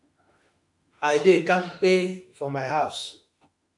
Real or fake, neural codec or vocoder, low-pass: fake; autoencoder, 48 kHz, 32 numbers a frame, DAC-VAE, trained on Japanese speech; 10.8 kHz